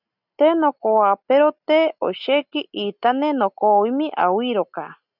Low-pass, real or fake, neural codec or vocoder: 5.4 kHz; real; none